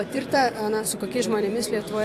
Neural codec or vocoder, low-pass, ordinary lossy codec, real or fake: vocoder, 44.1 kHz, 128 mel bands every 256 samples, BigVGAN v2; 14.4 kHz; AAC, 64 kbps; fake